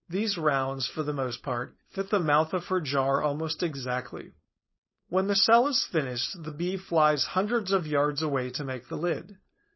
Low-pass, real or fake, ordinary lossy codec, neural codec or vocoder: 7.2 kHz; fake; MP3, 24 kbps; codec, 16 kHz, 4.8 kbps, FACodec